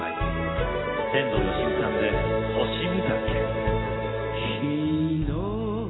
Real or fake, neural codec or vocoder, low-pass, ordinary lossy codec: real; none; 7.2 kHz; AAC, 16 kbps